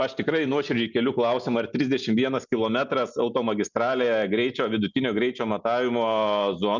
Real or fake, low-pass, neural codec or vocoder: real; 7.2 kHz; none